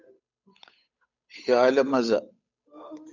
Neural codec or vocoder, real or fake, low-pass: codec, 16 kHz, 8 kbps, FunCodec, trained on Chinese and English, 25 frames a second; fake; 7.2 kHz